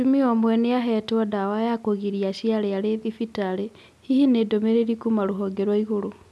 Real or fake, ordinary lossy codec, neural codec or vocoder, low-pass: real; none; none; none